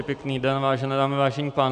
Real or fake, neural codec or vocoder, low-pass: real; none; 9.9 kHz